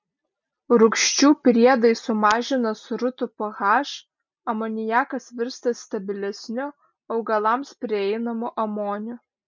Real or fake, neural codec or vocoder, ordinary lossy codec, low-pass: real; none; MP3, 64 kbps; 7.2 kHz